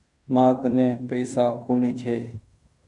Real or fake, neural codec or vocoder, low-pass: fake; codec, 16 kHz in and 24 kHz out, 0.9 kbps, LongCat-Audio-Codec, fine tuned four codebook decoder; 10.8 kHz